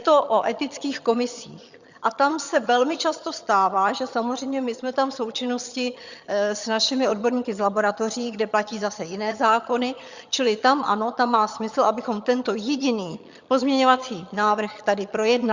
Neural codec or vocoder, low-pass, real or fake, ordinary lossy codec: vocoder, 22.05 kHz, 80 mel bands, HiFi-GAN; 7.2 kHz; fake; Opus, 64 kbps